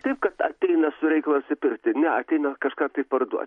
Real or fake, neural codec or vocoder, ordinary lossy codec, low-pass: real; none; MP3, 48 kbps; 19.8 kHz